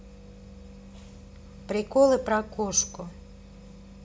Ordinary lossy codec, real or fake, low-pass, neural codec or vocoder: none; real; none; none